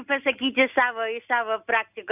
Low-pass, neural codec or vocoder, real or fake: 3.6 kHz; none; real